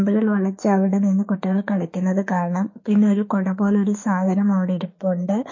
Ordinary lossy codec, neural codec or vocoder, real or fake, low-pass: MP3, 32 kbps; autoencoder, 48 kHz, 32 numbers a frame, DAC-VAE, trained on Japanese speech; fake; 7.2 kHz